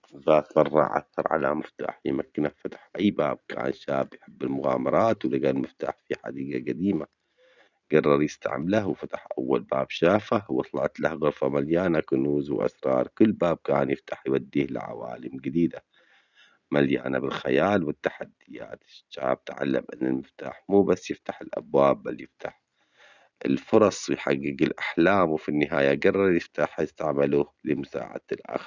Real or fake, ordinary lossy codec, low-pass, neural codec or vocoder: real; none; 7.2 kHz; none